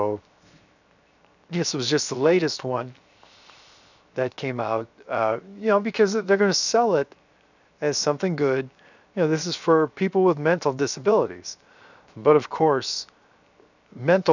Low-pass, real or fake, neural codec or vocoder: 7.2 kHz; fake; codec, 16 kHz, 0.7 kbps, FocalCodec